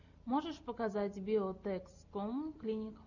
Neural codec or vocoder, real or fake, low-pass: none; real; 7.2 kHz